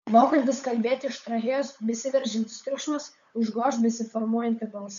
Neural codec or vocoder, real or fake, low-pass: codec, 16 kHz, 8 kbps, FunCodec, trained on LibriTTS, 25 frames a second; fake; 7.2 kHz